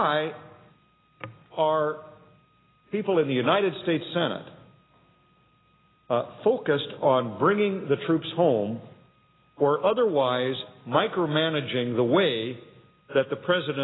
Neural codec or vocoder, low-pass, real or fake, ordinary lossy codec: none; 7.2 kHz; real; AAC, 16 kbps